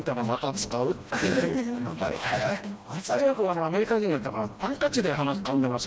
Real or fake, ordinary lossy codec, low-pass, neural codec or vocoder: fake; none; none; codec, 16 kHz, 1 kbps, FreqCodec, smaller model